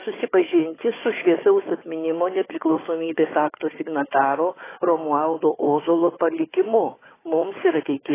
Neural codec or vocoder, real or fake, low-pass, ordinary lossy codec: codec, 16 kHz, 4 kbps, FunCodec, trained on Chinese and English, 50 frames a second; fake; 3.6 kHz; AAC, 16 kbps